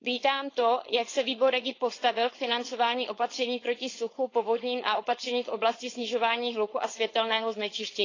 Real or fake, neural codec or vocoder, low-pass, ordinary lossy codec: fake; codec, 16 kHz, 4.8 kbps, FACodec; 7.2 kHz; AAC, 32 kbps